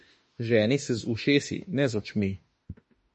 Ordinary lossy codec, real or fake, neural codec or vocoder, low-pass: MP3, 32 kbps; fake; autoencoder, 48 kHz, 32 numbers a frame, DAC-VAE, trained on Japanese speech; 10.8 kHz